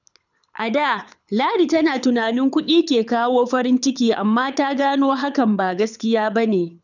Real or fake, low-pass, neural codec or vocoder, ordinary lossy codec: fake; 7.2 kHz; codec, 24 kHz, 6 kbps, HILCodec; none